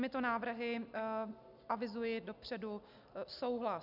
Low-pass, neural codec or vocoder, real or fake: 5.4 kHz; none; real